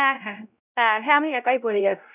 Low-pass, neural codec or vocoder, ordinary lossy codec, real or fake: 3.6 kHz; codec, 16 kHz, 0.5 kbps, X-Codec, WavLM features, trained on Multilingual LibriSpeech; none; fake